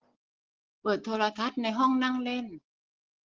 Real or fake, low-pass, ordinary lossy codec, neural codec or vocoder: real; 7.2 kHz; Opus, 16 kbps; none